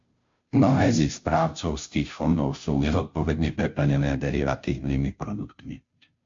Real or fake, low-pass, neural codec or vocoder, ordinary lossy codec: fake; 7.2 kHz; codec, 16 kHz, 0.5 kbps, FunCodec, trained on Chinese and English, 25 frames a second; MP3, 48 kbps